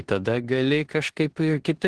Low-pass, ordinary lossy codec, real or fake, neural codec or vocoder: 10.8 kHz; Opus, 16 kbps; fake; codec, 16 kHz in and 24 kHz out, 0.9 kbps, LongCat-Audio-Codec, four codebook decoder